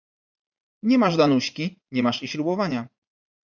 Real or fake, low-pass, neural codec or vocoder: fake; 7.2 kHz; vocoder, 44.1 kHz, 80 mel bands, Vocos